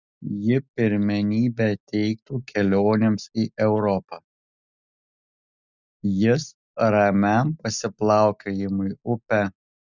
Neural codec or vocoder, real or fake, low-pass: none; real; 7.2 kHz